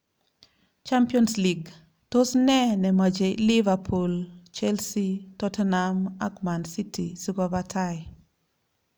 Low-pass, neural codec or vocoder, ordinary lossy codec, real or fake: none; none; none; real